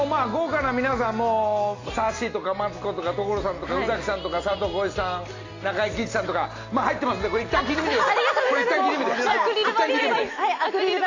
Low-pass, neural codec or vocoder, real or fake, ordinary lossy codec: 7.2 kHz; none; real; AAC, 32 kbps